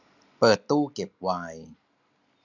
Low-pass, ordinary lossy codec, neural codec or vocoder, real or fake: 7.2 kHz; none; none; real